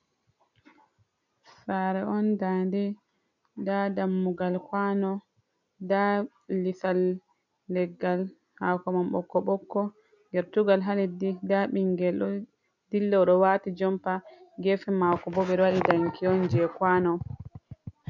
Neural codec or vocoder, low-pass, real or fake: none; 7.2 kHz; real